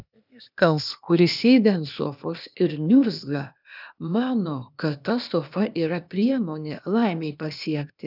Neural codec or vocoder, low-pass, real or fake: codec, 16 kHz, 0.8 kbps, ZipCodec; 5.4 kHz; fake